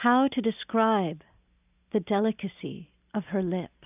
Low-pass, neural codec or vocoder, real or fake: 3.6 kHz; none; real